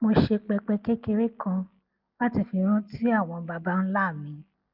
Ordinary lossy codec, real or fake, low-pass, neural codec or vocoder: Opus, 24 kbps; real; 5.4 kHz; none